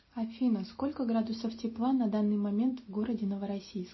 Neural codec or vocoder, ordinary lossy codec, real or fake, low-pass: none; MP3, 24 kbps; real; 7.2 kHz